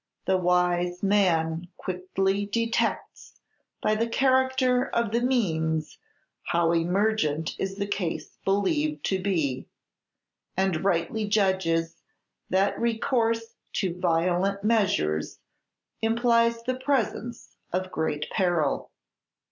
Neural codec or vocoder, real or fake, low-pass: none; real; 7.2 kHz